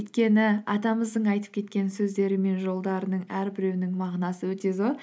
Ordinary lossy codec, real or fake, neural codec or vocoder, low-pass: none; real; none; none